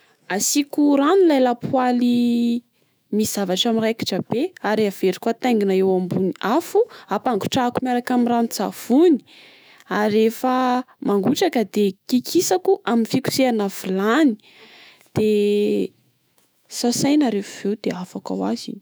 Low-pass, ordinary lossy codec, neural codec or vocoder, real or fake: none; none; autoencoder, 48 kHz, 128 numbers a frame, DAC-VAE, trained on Japanese speech; fake